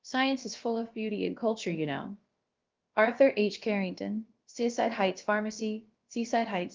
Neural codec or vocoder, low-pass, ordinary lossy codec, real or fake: codec, 16 kHz, about 1 kbps, DyCAST, with the encoder's durations; 7.2 kHz; Opus, 24 kbps; fake